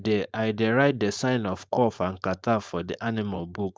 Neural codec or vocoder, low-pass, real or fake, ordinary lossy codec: codec, 16 kHz, 4.8 kbps, FACodec; none; fake; none